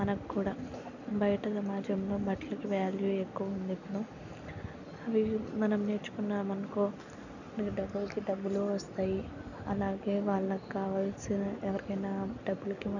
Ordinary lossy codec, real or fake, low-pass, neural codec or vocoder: none; real; 7.2 kHz; none